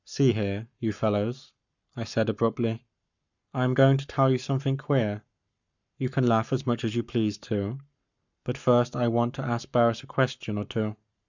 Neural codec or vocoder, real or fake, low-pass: codec, 44.1 kHz, 7.8 kbps, Pupu-Codec; fake; 7.2 kHz